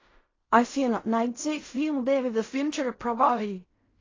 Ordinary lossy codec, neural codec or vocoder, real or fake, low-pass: AAC, 32 kbps; codec, 16 kHz in and 24 kHz out, 0.4 kbps, LongCat-Audio-Codec, fine tuned four codebook decoder; fake; 7.2 kHz